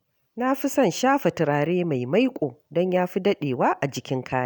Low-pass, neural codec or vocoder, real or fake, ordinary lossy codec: none; none; real; none